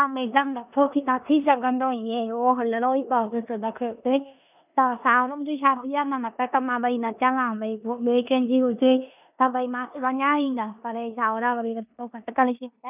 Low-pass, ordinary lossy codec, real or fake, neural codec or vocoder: 3.6 kHz; none; fake; codec, 16 kHz in and 24 kHz out, 0.9 kbps, LongCat-Audio-Codec, four codebook decoder